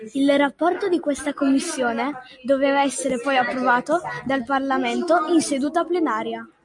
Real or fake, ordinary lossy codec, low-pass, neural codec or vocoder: real; MP3, 64 kbps; 10.8 kHz; none